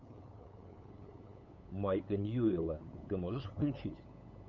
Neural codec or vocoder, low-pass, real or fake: codec, 16 kHz, 8 kbps, FunCodec, trained on LibriTTS, 25 frames a second; 7.2 kHz; fake